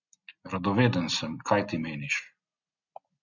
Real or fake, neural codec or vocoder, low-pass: real; none; 7.2 kHz